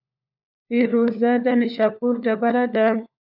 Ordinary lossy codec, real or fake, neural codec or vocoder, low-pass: AAC, 48 kbps; fake; codec, 16 kHz, 4 kbps, FunCodec, trained on LibriTTS, 50 frames a second; 5.4 kHz